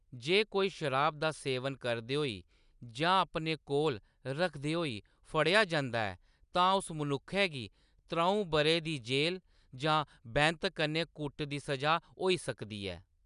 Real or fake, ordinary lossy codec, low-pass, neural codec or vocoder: real; none; 10.8 kHz; none